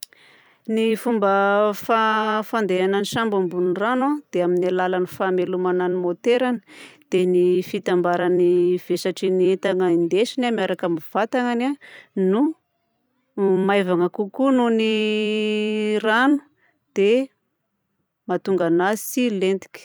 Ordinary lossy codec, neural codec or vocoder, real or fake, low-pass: none; vocoder, 44.1 kHz, 128 mel bands every 256 samples, BigVGAN v2; fake; none